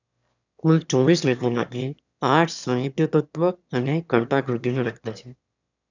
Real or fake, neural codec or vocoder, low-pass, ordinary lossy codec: fake; autoencoder, 22.05 kHz, a latent of 192 numbers a frame, VITS, trained on one speaker; 7.2 kHz; none